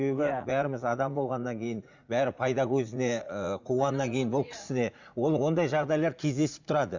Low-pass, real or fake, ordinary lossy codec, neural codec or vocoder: 7.2 kHz; fake; Opus, 64 kbps; vocoder, 44.1 kHz, 80 mel bands, Vocos